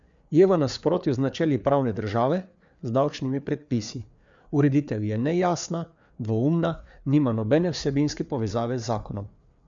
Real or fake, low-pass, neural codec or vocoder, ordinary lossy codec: fake; 7.2 kHz; codec, 16 kHz, 4 kbps, FreqCodec, larger model; MP3, 64 kbps